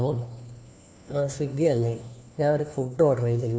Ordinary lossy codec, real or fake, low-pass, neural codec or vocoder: none; fake; none; codec, 16 kHz, 1 kbps, FunCodec, trained on LibriTTS, 50 frames a second